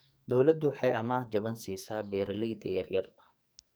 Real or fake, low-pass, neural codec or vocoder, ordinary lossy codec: fake; none; codec, 44.1 kHz, 2.6 kbps, SNAC; none